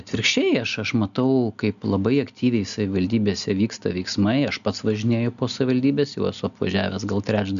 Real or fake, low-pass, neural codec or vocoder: real; 7.2 kHz; none